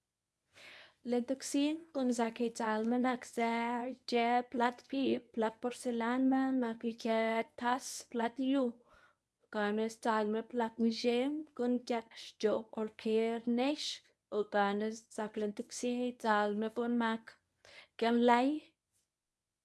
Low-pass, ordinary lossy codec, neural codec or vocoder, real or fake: none; none; codec, 24 kHz, 0.9 kbps, WavTokenizer, medium speech release version 1; fake